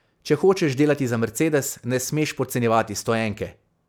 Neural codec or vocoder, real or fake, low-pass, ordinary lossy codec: none; real; none; none